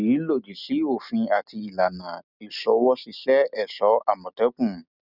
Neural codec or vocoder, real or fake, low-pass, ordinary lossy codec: none; real; 5.4 kHz; none